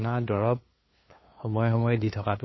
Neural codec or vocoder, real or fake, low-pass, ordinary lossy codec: codec, 16 kHz, 2 kbps, FunCodec, trained on LibriTTS, 25 frames a second; fake; 7.2 kHz; MP3, 24 kbps